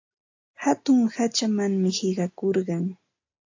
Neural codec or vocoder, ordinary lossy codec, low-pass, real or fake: none; AAC, 48 kbps; 7.2 kHz; real